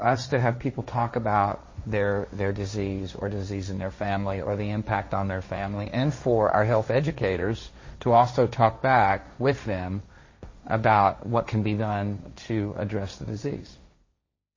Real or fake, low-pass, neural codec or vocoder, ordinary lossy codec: fake; 7.2 kHz; codec, 16 kHz, 1.1 kbps, Voila-Tokenizer; MP3, 32 kbps